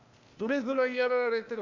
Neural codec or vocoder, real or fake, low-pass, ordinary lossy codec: codec, 16 kHz, 0.8 kbps, ZipCodec; fake; 7.2 kHz; MP3, 64 kbps